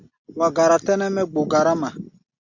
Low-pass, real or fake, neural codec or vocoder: 7.2 kHz; real; none